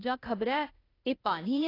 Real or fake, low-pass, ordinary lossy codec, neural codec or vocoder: fake; 5.4 kHz; AAC, 24 kbps; codec, 16 kHz, 0.8 kbps, ZipCodec